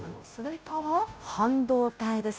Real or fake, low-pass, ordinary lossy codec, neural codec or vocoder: fake; none; none; codec, 16 kHz, 0.5 kbps, FunCodec, trained on Chinese and English, 25 frames a second